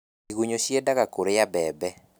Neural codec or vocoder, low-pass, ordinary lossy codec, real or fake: none; none; none; real